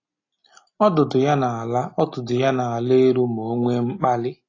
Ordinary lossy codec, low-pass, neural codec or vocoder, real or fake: AAC, 32 kbps; 7.2 kHz; none; real